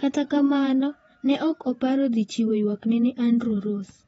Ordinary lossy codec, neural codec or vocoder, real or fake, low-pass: AAC, 24 kbps; vocoder, 48 kHz, 128 mel bands, Vocos; fake; 19.8 kHz